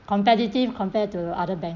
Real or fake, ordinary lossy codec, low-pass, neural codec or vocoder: real; none; 7.2 kHz; none